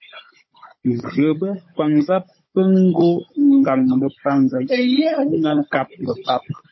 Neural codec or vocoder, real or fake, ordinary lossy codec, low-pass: codec, 16 kHz, 16 kbps, FunCodec, trained on Chinese and English, 50 frames a second; fake; MP3, 24 kbps; 7.2 kHz